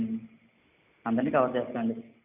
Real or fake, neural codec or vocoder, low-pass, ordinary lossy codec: real; none; 3.6 kHz; none